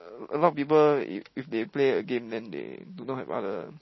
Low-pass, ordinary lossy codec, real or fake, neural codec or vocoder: 7.2 kHz; MP3, 24 kbps; real; none